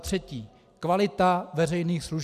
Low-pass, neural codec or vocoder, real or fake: 14.4 kHz; none; real